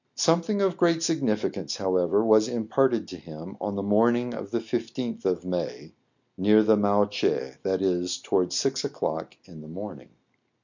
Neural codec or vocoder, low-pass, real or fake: none; 7.2 kHz; real